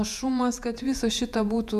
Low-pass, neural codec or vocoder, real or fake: 14.4 kHz; vocoder, 48 kHz, 128 mel bands, Vocos; fake